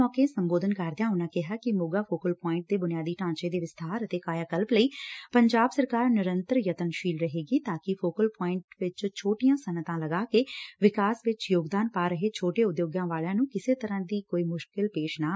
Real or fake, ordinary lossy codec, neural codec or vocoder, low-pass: real; none; none; none